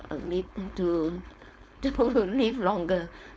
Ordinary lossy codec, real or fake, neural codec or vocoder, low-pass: none; fake; codec, 16 kHz, 4.8 kbps, FACodec; none